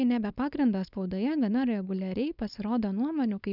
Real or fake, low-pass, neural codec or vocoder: fake; 5.4 kHz; codec, 16 kHz, 4.8 kbps, FACodec